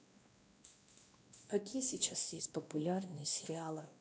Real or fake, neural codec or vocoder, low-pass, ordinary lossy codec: fake; codec, 16 kHz, 1 kbps, X-Codec, WavLM features, trained on Multilingual LibriSpeech; none; none